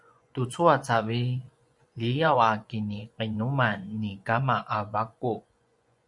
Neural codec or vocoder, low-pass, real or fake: vocoder, 24 kHz, 100 mel bands, Vocos; 10.8 kHz; fake